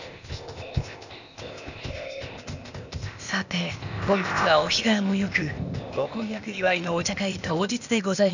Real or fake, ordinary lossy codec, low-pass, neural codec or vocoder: fake; none; 7.2 kHz; codec, 16 kHz, 0.8 kbps, ZipCodec